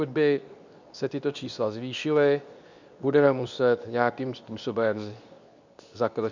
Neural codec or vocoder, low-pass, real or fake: codec, 24 kHz, 0.9 kbps, WavTokenizer, medium speech release version 2; 7.2 kHz; fake